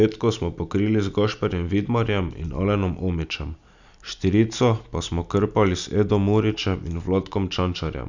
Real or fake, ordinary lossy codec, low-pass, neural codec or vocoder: real; none; 7.2 kHz; none